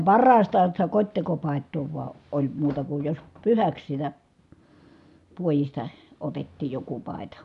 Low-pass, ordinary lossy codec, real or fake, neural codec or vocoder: 10.8 kHz; none; real; none